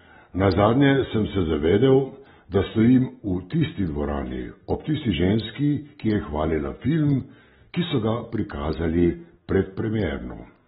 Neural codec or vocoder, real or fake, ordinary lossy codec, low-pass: none; real; AAC, 16 kbps; 19.8 kHz